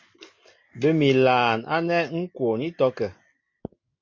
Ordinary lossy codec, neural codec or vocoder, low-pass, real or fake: MP3, 48 kbps; none; 7.2 kHz; real